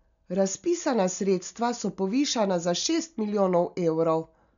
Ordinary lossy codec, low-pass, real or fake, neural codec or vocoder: none; 7.2 kHz; real; none